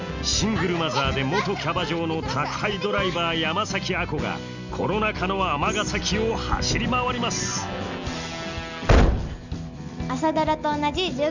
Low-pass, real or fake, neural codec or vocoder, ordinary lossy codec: 7.2 kHz; real; none; none